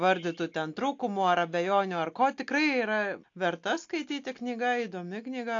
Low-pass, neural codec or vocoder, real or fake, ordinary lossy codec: 7.2 kHz; none; real; AAC, 48 kbps